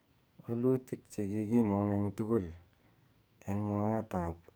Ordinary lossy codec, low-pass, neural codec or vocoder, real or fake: none; none; codec, 44.1 kHz, 2.6 kbps, SNAC; fake